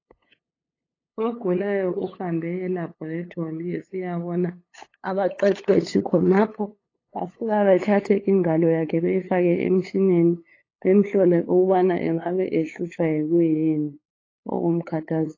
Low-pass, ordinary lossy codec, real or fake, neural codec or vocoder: 7.2 kHz; AAC, 32 kbps; fake; codec, 16 kHz, 8 kbps, FunCodec, trained on LibriTTS, 25 frames a second